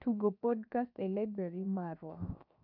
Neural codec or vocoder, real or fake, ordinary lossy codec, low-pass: codec, 16 kHz, 0.7 kbps, FocalCodec; fake; none; 5.4 kHz